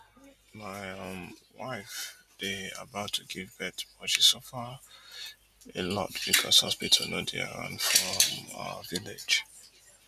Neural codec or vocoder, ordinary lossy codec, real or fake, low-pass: none; none; real; 14.4 kHz